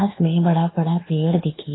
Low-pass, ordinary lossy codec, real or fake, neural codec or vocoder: 7.2 kHz; AAC, 16 kbps; fake; codec, 24 kHz, 1.2 kbps, DualCodec